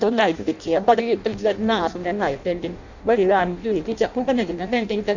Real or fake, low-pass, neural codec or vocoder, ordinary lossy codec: fake; 7.2 kHz; codec, 16 kHz in and 24 kHz out, 0.6 kbps, FireRedTTS-2 codec; none